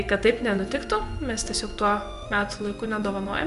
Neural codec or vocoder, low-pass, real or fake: vocoder, 24 kHz, 100 mel bands, Vocos; 10.8 kHz; fake